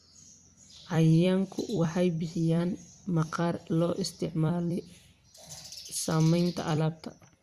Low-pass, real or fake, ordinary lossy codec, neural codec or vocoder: 14.4 kHz; fake; Opus, 64 kbps; vocoder, 44.1 kHz, 128 mel bands every 256 samples, BigVGAN v2